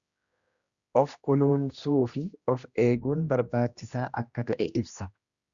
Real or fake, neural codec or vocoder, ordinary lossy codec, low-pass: fake; codec, 16 kHz, 1 kbps, X-Codec, HuBERT features, trained on general audio; Opus, 64 kbps; 7.2 kHz